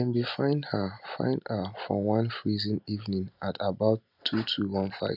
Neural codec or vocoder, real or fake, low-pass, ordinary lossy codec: none; real; 5.4 kHz; none